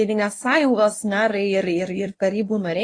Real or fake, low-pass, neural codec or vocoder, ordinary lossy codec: fake; 9.9 kHz; codec, 24 kHz, 0.9 kbps, WavTokenizer, medium speech release version 1; AAC, 32 kbps